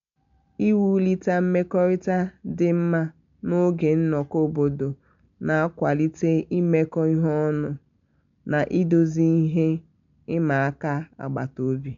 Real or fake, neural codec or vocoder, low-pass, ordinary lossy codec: real; none; 7.2 kHz; MP3, 64 kbps